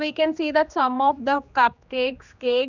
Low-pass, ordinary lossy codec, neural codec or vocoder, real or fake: 7.2 kHz; none; codec, 16 kHz, 2 kbps, X-Codec, HuBERT features, trained on general audio; fake